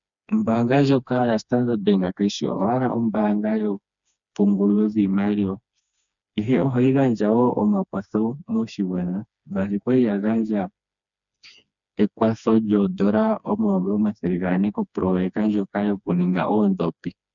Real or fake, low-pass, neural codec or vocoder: fake; 7.2 kHz; codec, 16 kHz, 2 kbps, FreqCodec, smaller model